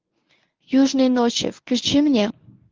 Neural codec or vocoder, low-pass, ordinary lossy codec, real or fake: codec, 24 kHz, 0.9 kbps, WavTokenizer, medium speech release version 1; 7.2 kHz; Opus, 32 kbps; fake